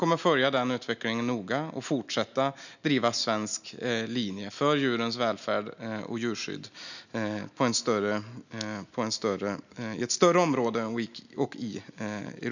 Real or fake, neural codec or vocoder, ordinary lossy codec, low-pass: real; none; none; 7.2 kHz